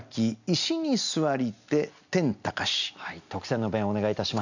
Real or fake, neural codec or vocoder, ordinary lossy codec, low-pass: real; none; none; 7.2 kHz